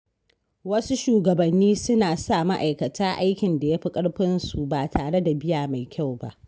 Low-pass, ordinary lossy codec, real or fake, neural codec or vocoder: none; none; real; none